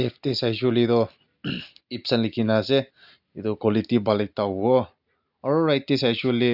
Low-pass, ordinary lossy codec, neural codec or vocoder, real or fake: 5.4 kHz; none; none; real